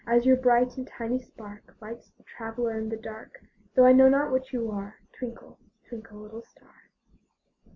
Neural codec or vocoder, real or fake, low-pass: none; real; 7.2 kHz